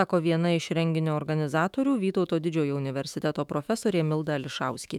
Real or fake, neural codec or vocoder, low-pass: fake; autoencoder, 48 kHz, 128 numbers a frame, DAC-VAE, trained on Japanese speech; 19.8 kHz